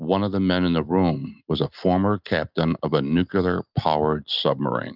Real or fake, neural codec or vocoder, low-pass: real; none; 5.4 kHz